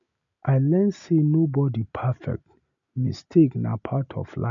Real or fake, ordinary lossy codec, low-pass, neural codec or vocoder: real; none; 7.2 kHz; none